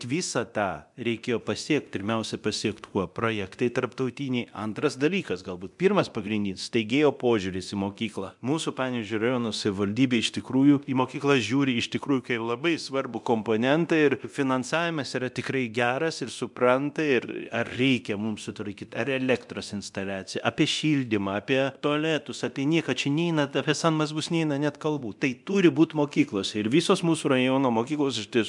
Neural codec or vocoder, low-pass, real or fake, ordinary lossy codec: codec, 24 kHz, 0.9 kbps, DualCodec; 10.8 kHz; fake; MP3, 96 kbps